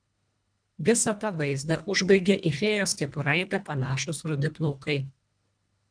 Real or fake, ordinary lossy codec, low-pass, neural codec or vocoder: fake; MP3, 96 kbps; 9.9 kHz; codec, 24 kHz, 1.5 kbps, HILCodec